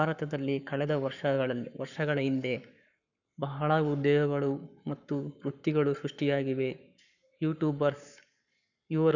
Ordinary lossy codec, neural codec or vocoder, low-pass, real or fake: none; codec, 44.1 kHz, 7.8 kbps, Pupu-Codec; 7.2 kHz; fake